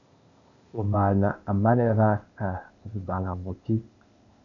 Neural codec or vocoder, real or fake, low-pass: codec, 16 kHz, 0.8 kbps, ZipCodec; fake; 7.2 kHz